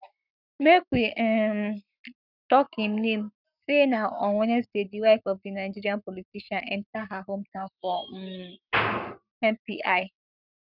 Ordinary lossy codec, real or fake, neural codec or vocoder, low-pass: none; fake; codec, 44.1 kHz, 7.8 kbps, Pupu-Codec; 5.4 kHz